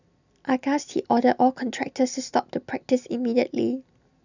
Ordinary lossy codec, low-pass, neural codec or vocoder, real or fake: none; 7.2 kHz; none; real